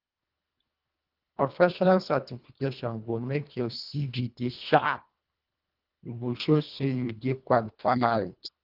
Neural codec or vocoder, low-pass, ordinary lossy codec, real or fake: codec, 24 kHz, 1.5 kbps, HILCodec; 5.4 kHz; Opus, 32 kbps; fake